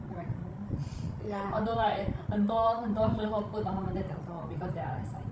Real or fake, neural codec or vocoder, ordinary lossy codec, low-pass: fake; codec, 16 kHz, 16 kbps, FreqCodec, larger model; none; none